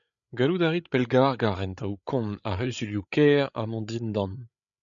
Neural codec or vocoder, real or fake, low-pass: codec, 16 kHz, 8 kbps, FreqCodec, larger model; fake; 7.2 kHz